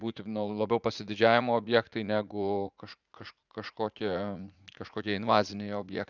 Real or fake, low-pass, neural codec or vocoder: fake; 7.2 kHz; vocoder, 44.1 kHz, 80 mel bands, Vocos